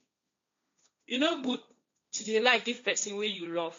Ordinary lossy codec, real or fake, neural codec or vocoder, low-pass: MP3, 48 kbps; fake; codec, 16 kHz, 1.1 kbps, Voila-Tokenizer; 7.2 kHz